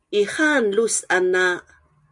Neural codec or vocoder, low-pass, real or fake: none; 10.8 kHz; real